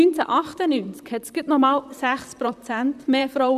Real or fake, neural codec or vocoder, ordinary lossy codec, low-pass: fake; vocoder, 44.1 kHz, 128 mel bands, Pupu-Vocoder; none; 14.4 kHz